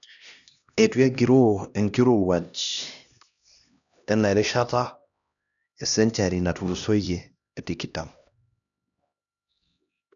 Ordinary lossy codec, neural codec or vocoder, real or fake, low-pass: none; codec, 16 kHz, 1 kbps, X-Codec, HuBERT features, trained on LibriSpeech; fake; 7.2 kHz